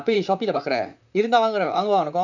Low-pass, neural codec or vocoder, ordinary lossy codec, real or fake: 7.2 kHz; vocoder, 44.1 kHz, 128 mel bands, Pupu-Vocoder; none; fake